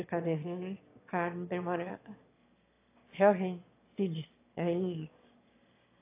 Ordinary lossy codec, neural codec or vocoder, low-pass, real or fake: AAC, 24 kbps; autoencoder, 22.05 kHz, a latent of 192 numbers a frame, VITS, trained on one speaker; 3.6 kHz; fake